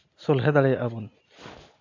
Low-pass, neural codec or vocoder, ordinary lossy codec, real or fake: 7.2 kHz; none; AAC, 48 kbps; real